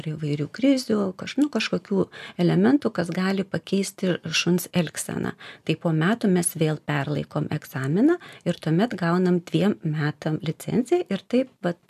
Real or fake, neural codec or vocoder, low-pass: real; none; 14.4 kHz